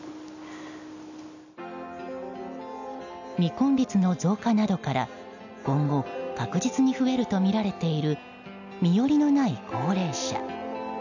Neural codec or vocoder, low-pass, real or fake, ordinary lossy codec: none; 7.2 kHz; real; none